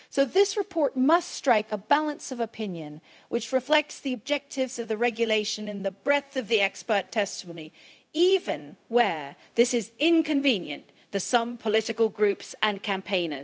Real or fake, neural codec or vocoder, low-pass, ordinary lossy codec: fake; codec, 16 kHz, 0.4 kbps, LongCat-Audio-Codec; none; none